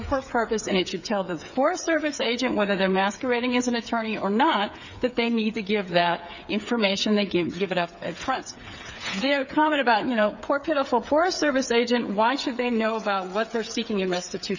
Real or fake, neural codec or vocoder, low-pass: fake; codec, 16 kHz, 8 kbps, FreqCodec, smaller model; 7.2 kHz